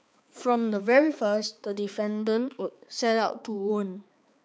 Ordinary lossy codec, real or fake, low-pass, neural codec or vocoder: none; fake; none; codec, 16 kHz, 4 kbps, X-Codec, HuBERT features, trained on balanced general audio